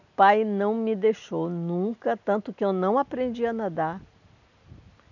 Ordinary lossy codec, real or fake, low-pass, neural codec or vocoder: none; real; 7.2 kHz; none